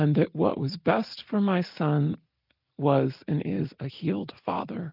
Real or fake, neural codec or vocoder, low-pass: real; none; 5.4 kHz